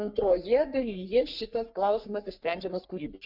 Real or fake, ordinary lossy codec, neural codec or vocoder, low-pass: fake; Opus, 64 kbps; codec, 44.1 kHz, 3.4 kbps, Pupu-Codec; 5.4 kHz